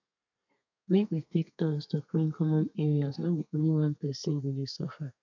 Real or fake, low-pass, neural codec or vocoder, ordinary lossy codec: fake; 7.2 kHz; codec, 32 kHz, 1.9 kbps, SNAC; none